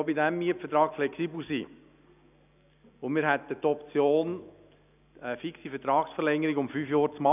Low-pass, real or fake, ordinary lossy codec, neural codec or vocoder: 3.6 kHz; real; none; none